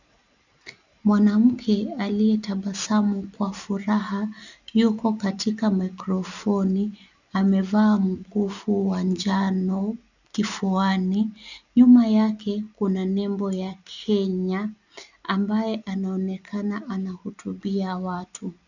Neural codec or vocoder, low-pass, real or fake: none; 7.2 kHz; real